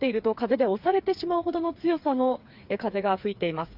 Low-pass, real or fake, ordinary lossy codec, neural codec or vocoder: 5.4 kHz; fake; Opus, 64 kbps; codec, 16 kHz, 4 kbps, FreqCodec, smaller model